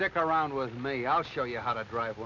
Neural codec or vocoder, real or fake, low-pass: none; real; 7.2 kHz